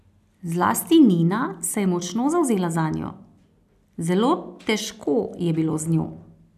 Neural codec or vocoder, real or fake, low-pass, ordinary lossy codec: none; real; 14.4 kHz; none